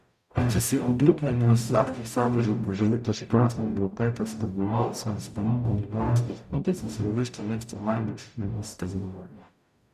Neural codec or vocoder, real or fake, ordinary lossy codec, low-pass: codec, 44.1 kHz, 0.9 kbps, DAC; fake; none; 14.4 kHz